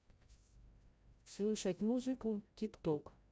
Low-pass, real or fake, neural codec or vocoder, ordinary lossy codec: none; fake; codec, 16 kHz, 0.5 kbps, FreqCodec, larger model; none